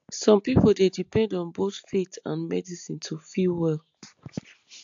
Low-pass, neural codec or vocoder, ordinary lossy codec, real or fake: 7.2 kHz; none; AAC, 64 kbps; real